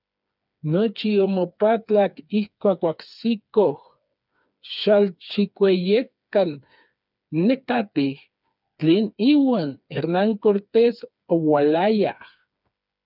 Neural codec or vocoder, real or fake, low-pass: codec, 16 kHz, 4 kbps, FreqCodec, smaller model; fake; 5.4 kHz